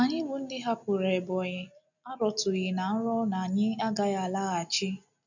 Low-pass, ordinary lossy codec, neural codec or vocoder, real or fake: 7.2 kHz; none; none; real